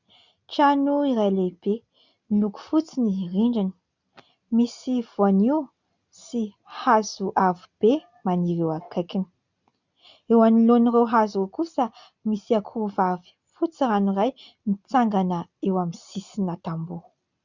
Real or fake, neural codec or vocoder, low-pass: real; none; 7.2 kHz